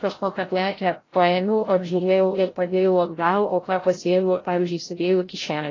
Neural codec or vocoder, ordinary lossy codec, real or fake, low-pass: codec, 16 kHz, 0.5 kbps, FreqCodec, larger model; AAC, 32 kbps; fake; 7.2 kHz